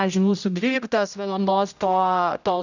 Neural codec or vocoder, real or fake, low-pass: codec, 16 kHz, 0.5 kbps, X-Codec, HuBERT features, trained on general audio; fake; 7.2 kHz